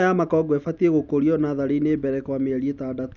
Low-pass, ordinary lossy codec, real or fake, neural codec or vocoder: 7.2 kHz; none; real; none